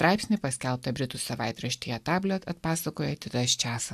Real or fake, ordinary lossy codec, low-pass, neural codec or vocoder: real; AAC, 96 kbps; 14.4 kHz; none